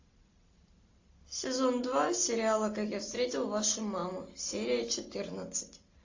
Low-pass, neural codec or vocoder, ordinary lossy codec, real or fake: 7.2 kHz; none; AAC, 48 kbps; real